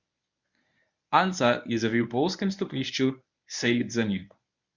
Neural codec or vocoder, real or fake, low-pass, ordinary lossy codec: codec, 24 kHz, 0.9 kbps, WavTokenizer, medium speech release version 1; fake; 7.2 kHz; none